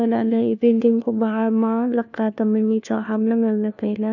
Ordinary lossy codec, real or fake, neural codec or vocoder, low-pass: none; fake; codec, 16 kHz, 1 kbps, FunCodec, trained on LibriTTS, 50 frames a second; 7.2 kHz